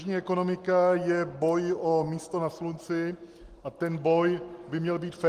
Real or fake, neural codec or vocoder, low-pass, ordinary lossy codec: real; none; 14.4 kHz; Opus, 24 kbps